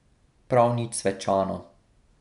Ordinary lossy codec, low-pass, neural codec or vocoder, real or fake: MP3, 96 kbps; 10.8 kHz; none; real